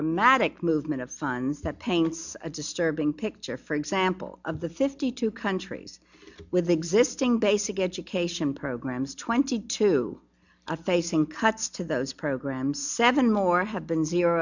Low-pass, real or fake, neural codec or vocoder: 7.2 kHz; real; none